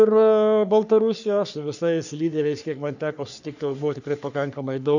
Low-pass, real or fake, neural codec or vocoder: 7.2 kHz; fake; codec, 44.1 kHz, 3.4 kbps, Pupu-Codec